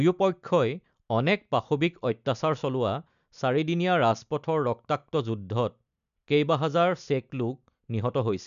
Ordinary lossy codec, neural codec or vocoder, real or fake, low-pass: none; none; real; 7.2 kHz